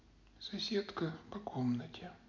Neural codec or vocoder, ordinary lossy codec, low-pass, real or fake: none; none; 7.2 kHz; real